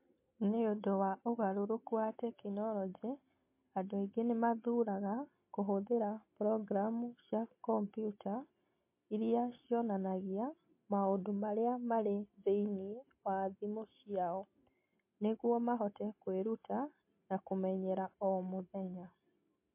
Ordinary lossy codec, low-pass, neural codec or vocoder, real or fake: MP3, 24 kbps; 3.6 kHz; none; real